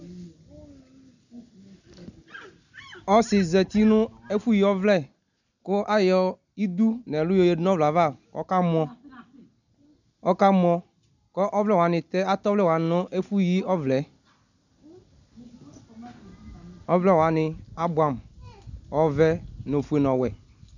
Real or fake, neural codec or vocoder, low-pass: real; none; 7.2 kHz